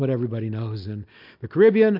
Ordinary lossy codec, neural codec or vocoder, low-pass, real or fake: MP3, 48 kbps; none; 5.4 kHz; real